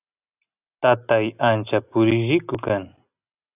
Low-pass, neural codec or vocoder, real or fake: 3.6 kHz; none; real